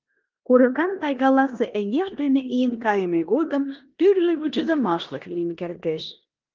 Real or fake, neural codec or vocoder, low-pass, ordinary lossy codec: fake; codec, 16 kHz in and 24 kHz out, 0.9 kbps, LongCat-Audio-Codec, four codebook decoder; 7.2 kHz; Opus, 32 kbps